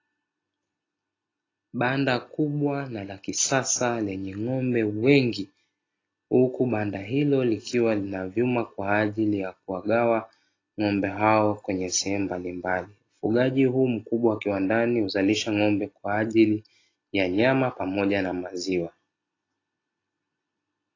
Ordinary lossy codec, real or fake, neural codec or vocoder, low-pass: AAC, 32 kbps; real; none; 7.2 kHz